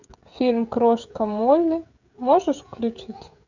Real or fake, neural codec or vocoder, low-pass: fake; codec, 16 kHz, 16 kbps, FreqCodec, smaller model; 7.2 kHz